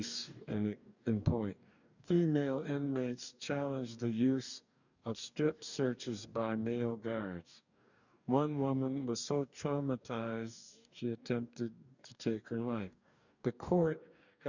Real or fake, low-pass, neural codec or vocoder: fake; 7.2 kHz; codec, 44.1 kHz, 2.6 kbps, DAC